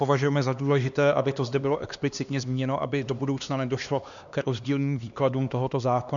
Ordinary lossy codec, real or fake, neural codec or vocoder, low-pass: MP3, 96 kbps; fake; codec, 16 kHz, 2 kbps, X-Codec, HuBERT features, trained on LibriSpeech; 7.2 kHz